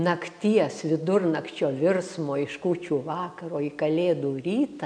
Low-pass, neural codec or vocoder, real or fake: 9.9 kHz; none; real